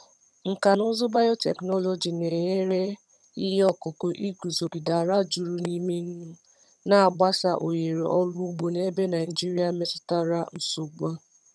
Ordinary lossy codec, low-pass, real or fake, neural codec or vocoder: none; none; fake; vocoder, 22.05 kHz, 80 mel bands, HiFi-GAN